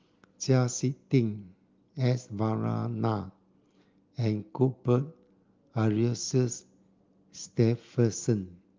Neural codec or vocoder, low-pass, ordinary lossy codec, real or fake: none; 7.2 kHz; Opus, 32 kbps; real